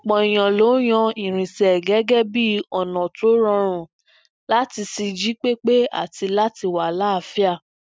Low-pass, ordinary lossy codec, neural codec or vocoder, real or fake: none; none; none; real